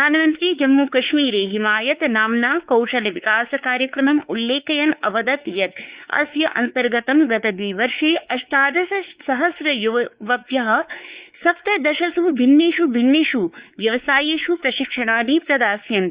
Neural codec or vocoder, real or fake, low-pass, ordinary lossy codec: codec, 16 kHz, 4 kbps, X-Codec, WavLM features, trained on Multilingual LibriSpeech; fake; 3.6 kHz; Opus, 64 kbps